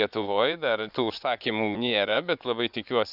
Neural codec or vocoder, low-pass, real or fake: vocoder, 24 kHz, 100 mel bands, Vocos; 5.4 kHz; fake